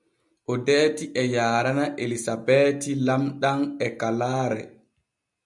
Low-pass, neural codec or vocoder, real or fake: 10.8 kHz; none; real